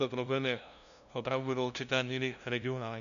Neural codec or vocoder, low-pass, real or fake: codec, 16 kHz, 0.5 kbps, FunCodec, trained on LibriTTS, 25 frames a second; 7.2 kHz; fake